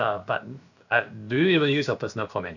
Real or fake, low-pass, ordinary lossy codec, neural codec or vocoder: fake; 7.2 kHz; none; codec, 16 kHz, about 1 kbps, DyCAST, with the encoder's durations